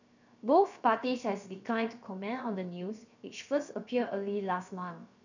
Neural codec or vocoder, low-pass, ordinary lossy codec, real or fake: codec, 16 kHz, 0.7 kbps, FocalCodec; 7.2 kHz; none; fake